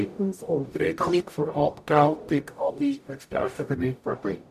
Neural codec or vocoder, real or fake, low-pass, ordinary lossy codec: codec, 44.1 kHz, 0.9 kbps, DAC; fake; 14.4 kHz; none